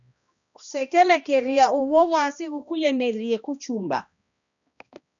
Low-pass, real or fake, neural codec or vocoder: 7.2 kHz; fake; codec, 16 kHz, 1 kbps, X-Codec, HuBERT features, trained on balanced general audio